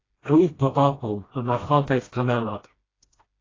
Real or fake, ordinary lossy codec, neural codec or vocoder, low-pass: fake; AAC, 32 kbps; codec, 16 kHz, 1 kbps, FreqCodec, smaller model; 7.2 kHz